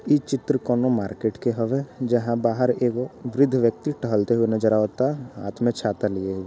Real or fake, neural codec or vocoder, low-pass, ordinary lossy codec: real; none; none; none